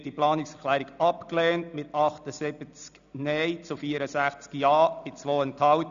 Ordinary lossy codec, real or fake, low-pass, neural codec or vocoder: none; real; 7.2 kHz; none